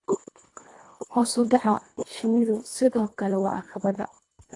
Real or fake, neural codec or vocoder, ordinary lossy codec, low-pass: fake; codec, 24 kHz, 1.5 kbps, HILCodec; AAC, 48 kbps; 10.8 kHz